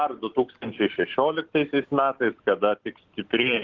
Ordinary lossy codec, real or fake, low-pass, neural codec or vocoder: Opus, 16 kbps; real; 7.2 kHz; none